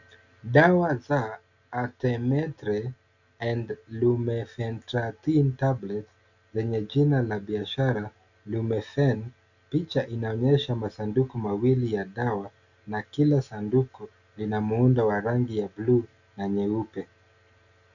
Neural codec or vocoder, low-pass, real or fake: none; 7.2 kHz; real